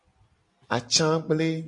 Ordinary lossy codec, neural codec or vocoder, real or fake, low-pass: MP3, 48 kbps; none; real; 10.8 kHz